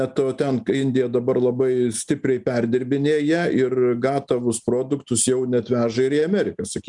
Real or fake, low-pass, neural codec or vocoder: real; 10.8 kHz; none